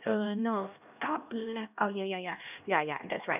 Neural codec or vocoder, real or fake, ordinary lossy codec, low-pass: codec, 16 kHz, 1 kbps, X-Codec, HuBERT features, trained on LibriSpeech; fake; none; 3.6 kHz